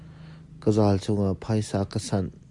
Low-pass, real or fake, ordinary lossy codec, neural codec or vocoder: 10.8 kHz; real; MP3, 64 kbps; none